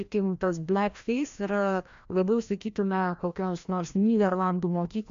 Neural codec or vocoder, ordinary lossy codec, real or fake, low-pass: codec, 16 kHz, 1 kbps, FreqCodec, larger model; AAC, 64 kbps; fake; 7.2 kHz